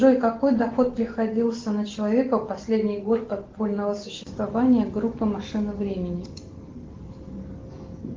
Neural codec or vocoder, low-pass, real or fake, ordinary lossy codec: none; 7.2 kHz; real; Opus, 16 kbps